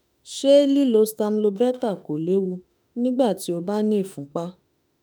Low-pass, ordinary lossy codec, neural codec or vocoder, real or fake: none; none; autoencoder, 48 kHz, 32 numbers a frame, DAC-VAE, trained on Japanese speech; fake